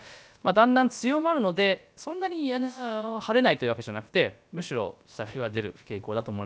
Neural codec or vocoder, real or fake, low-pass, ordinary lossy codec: codec, 16 kHz, about 1 kbps, DyCAST, with the encoder's durations; fake; none; none